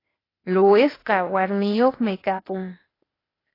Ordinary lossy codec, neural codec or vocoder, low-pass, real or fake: AAC, 24 kbps; codec, 16 kHz, 0.8 kbps, ZipCodec; 5.4 kHz; fake